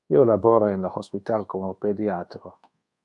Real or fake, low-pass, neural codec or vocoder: fake; 10.8 kHz; codec, 24 kHz, 1.2 kbps, DualCodec